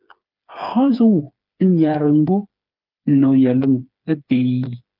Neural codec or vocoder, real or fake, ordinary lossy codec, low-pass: codec, 16 kHz, 4 kbps, FreqCodec, smaller model; fake; Opus, 24 kbps; 5.4 kHz